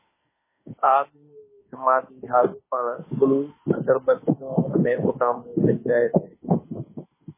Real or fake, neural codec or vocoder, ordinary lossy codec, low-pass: fake; autoencoder, 48 kHz, 32 numbers a frame, DAC-VAE, trained on Japanese speech; MP3, 16 kbps; 3.6 kHz